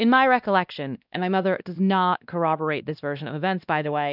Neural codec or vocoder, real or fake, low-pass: codec, 16 kHz, 1 kbps, X-Codec, WavLM features, trained on Multilingual LibriSpeech; fake; 5.4 kHz